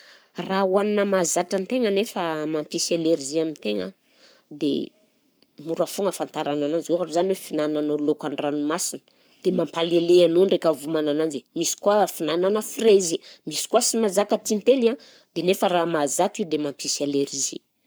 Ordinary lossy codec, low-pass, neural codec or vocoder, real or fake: none; none; codec, 44.1 kHz, 7.8 kbps, Pupu-Codec; fake